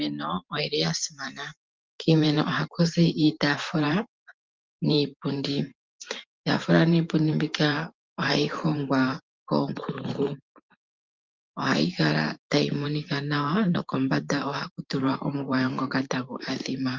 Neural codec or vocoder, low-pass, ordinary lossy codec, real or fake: vocoder, 44.1 kHz, 128 mel bands every 512 samples, BigVGAN v2; 7.2 kHz; Opus, 32 kbps; fake